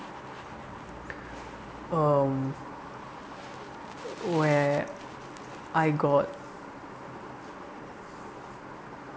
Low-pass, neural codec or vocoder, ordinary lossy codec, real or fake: none; none; none; real